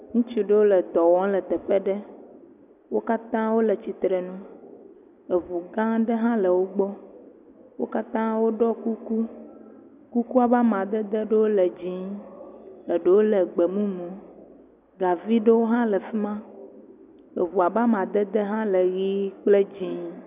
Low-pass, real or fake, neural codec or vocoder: 3.6 kHz; real; none